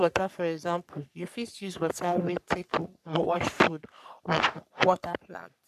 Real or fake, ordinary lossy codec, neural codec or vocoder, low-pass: fake; none; codec, 44.1 kHz, 3.4 kbps, Pupu-Codec; 14.4 kHz